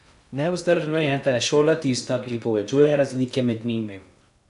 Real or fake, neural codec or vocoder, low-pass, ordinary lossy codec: fake; codec, 16 kHz in and 24 kHz out, 0.6 kbps, FocalCodec, streaming, 2048 codes; 10.8 kHz; none